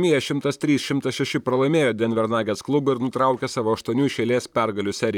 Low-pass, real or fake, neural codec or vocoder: 19.8 kHz; fake; vocoder, 44.1 kHz, 128 mel bands, Pupu-Vocoder